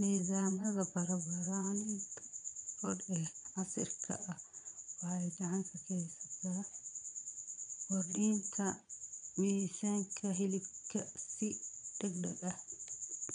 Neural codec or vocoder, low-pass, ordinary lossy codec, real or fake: vocoder, 22.05 kHz, 80 mel bands, Vocos; 9.9 kHz; none; fake